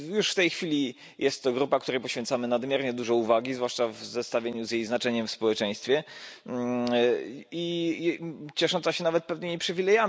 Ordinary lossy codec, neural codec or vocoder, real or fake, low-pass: none; none; real; none